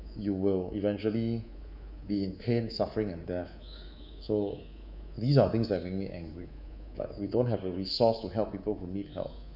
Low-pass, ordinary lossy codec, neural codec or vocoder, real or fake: 5.4 kHz; none; codec, 24 kHz, 3.1 kbps, DualCodec; fake